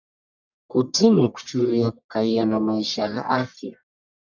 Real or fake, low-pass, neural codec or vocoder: fake; 7.2 kHz; codec, 44.1 kHz, 1.7 kbps, Pupu-Codec